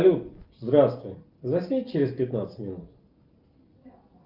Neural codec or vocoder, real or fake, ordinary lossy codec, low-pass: none; real; Opus, 24 kbps; 5.4 kHz